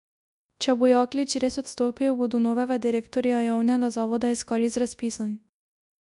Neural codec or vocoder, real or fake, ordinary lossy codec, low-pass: codec, 24 kHz, 0.9 kbps, WavTokenizer, large speech release; fake; none; 10.8 kHz